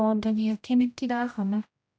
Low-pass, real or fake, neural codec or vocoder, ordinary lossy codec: none; fake; codec, 16 kHz, 0.5 kbps, X-Codec, HuBERT features, trained on general audio; none